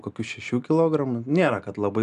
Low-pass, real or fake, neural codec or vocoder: 10.8 kHz; real; none